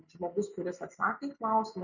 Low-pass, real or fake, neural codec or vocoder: 7.2 kHz; real; none